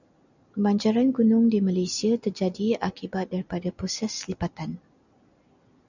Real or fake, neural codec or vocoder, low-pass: real; none; 7.2 kHz